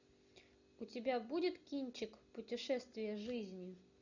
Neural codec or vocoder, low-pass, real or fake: none; 7.2 kHz; real